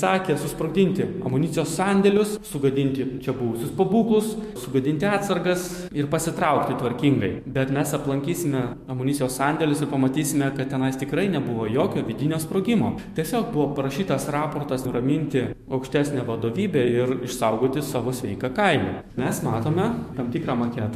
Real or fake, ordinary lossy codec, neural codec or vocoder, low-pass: fake; MP3, 64 kbps; autoencoder, 48 kHz, 128 numbers a frame, DAC-VAE, trained on Japanese speech; 14.4 kHz